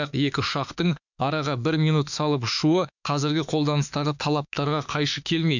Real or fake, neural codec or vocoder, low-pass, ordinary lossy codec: fake; autoencoder, 48 kHz, 32 numbers a frame, DAC-VAE, trained on Japanese speech; 7.2 kHz; none